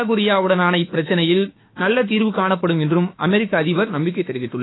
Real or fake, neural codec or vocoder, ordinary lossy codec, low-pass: fake; autoencoder, 48 kHz, 32 numbers a frame, DAC-VAE, trained on Japanese speech; AAC, 16 kbps; 7.2 kHz